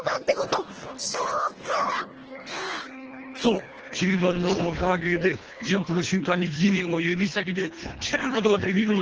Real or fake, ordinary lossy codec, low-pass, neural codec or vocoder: fake; Opus, 16 kbps; 7.2 kHz; codec, 24 kHz, 1.5 kbps, HILCodec